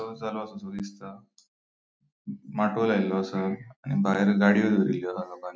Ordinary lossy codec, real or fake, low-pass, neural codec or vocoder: none; real; none; none